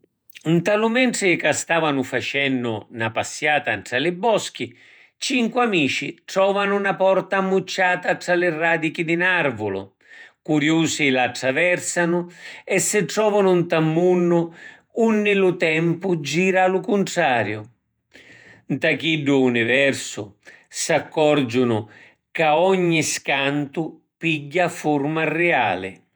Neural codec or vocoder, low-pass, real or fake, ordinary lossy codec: vocoder, 48 kHz, 128 mel bands, Vocos; none; fake; none